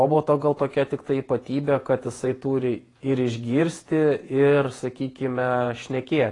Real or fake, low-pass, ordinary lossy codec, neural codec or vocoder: fake; 10.8 kHz; AAC, 32 kbps; vocoder, 48 kHz, 128 mel bands, Vocos